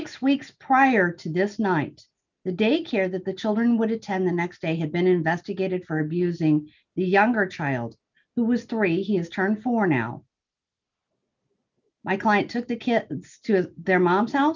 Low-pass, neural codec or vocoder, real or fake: 7.2 kHz; none; real